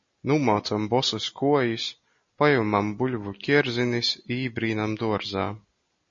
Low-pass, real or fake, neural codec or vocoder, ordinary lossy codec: 7.2 kHz; real; none; MP3, 32 kbps